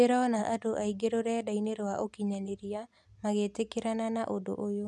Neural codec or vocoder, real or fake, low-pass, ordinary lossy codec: none; real; none; none